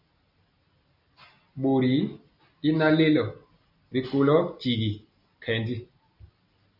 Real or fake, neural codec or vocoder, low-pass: real; none; 5.4 kHz